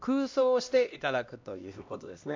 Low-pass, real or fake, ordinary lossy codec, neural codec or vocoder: 7.2 kHz; fake; MP3, 64 kbps; codec, 16 kHz, 1 kbps, X-Codec, HuBERT features, trained on LibriSpeech